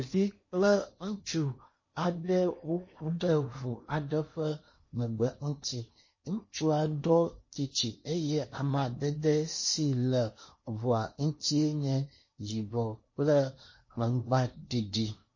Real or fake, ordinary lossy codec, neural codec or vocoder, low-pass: fake; MP3, 32 kbps; codec, 16 kHz in and 24 kHz out, 0.8 kbps, FocalCodec, streaming, 65536 codes; 7.2 kHz